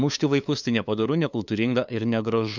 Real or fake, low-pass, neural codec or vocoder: fake; 7.2 kHz; codec, 16 kHz, 2 kbps, FunCodec, trained on LibriTTS, 25 frames a second